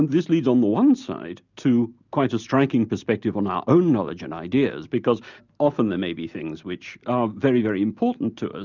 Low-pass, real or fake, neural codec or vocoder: 7.2 kHz; real; none